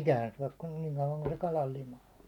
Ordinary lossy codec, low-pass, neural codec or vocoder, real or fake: none; 19.8 kHz; none; real